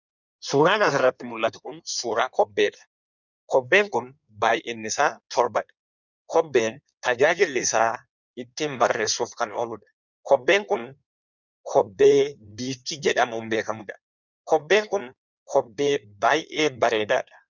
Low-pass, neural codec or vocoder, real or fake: 7.2 kHz; codec, 16 kHz in and 24 kHz out, 1.1 kbps, FireRedTTS-2 codec; fake